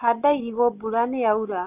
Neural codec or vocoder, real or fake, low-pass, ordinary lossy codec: none; real; 3.6 kHz; none